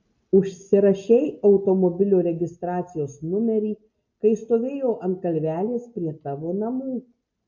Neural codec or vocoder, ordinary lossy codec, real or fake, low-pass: none; MP3, 48 kbps; real; 7.2 kHz